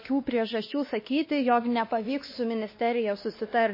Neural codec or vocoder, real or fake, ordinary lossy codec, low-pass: codec, 16 kHz, 2 kbps, X-Codec, WavLM features, trained on Multilingual LibriSpeech; fake; MP3, 24 kbps; 5.4 kHz